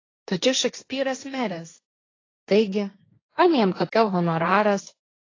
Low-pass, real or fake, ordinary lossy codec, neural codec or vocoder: 7.2 kHz; fake; AAC, 32 kbps; codec, 16 kHz, 1.1 kbps, Voila-Tokenizer